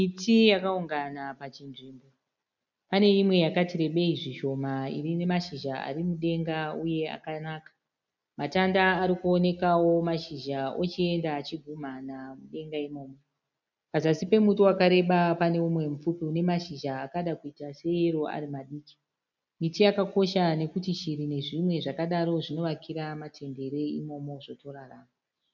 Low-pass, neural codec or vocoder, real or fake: 7.2 kHz; none; real